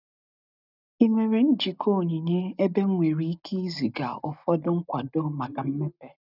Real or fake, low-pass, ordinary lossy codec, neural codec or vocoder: real; 5.4 kHz; none; none